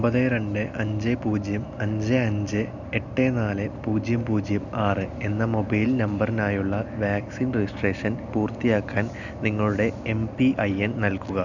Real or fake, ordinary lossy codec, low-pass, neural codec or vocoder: real; none; 7.2 kHz; none